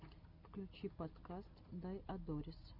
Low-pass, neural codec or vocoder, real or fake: 5.4 kHz; none; real